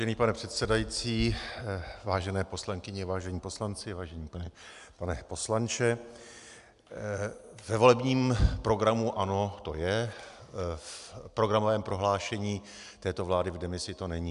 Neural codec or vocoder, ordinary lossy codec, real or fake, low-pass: none; MP3, 96 kbps; real; 10.8 kHz